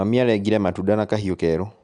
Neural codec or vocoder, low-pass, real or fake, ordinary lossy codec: none; 10.8 kHz; real; none